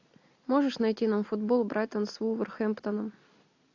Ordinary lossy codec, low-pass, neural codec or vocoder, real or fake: AAC, 48 kbps; 7.2 kHz; none; real